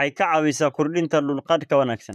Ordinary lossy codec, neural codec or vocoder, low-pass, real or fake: none; vocoder, 44.1 kHz, 128 mel bands, Pupu-Vocoder; 14.4 kHz; fake